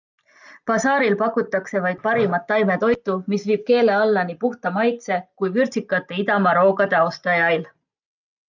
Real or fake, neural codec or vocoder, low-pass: real; none; 7.2 kHz